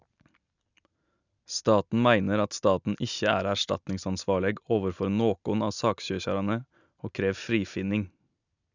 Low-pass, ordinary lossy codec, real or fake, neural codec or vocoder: 7.2 kHz; none; real; none